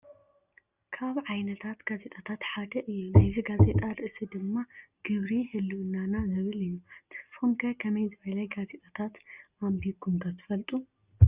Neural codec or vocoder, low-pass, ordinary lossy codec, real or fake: none; 3.6 kHz; Opus, 64 kbps; real